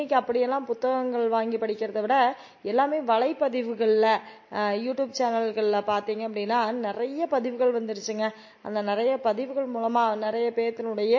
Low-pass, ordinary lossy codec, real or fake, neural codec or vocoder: 7.2 kHz; MP3, 32 kbps; real; none